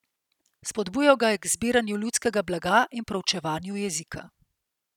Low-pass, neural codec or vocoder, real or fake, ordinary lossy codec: 19.8 kHz; none; real; none